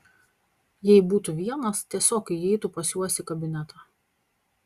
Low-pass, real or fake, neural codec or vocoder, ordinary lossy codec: 14.4 kHz; real; none; Opus, 64 kbps